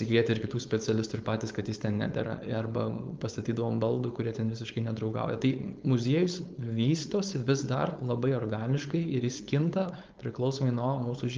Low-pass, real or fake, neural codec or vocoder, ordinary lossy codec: 7.2 kHz; fake; codec, 16 kHz, 4.8 kbps, FACodec; Opus, 24 kbps